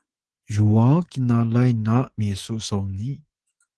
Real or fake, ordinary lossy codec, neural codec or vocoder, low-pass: fake; Opus, 16 kbps; codec, 24 kHz, 3.1 kbps, DualCodec; 10.8 kHz